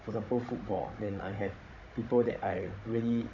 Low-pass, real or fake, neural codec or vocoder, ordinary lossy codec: 7.2 kHz; fake; codec, 16 kHz, 16 kbps, FunCodec, trained on Chinese and English, 50 frames a second; none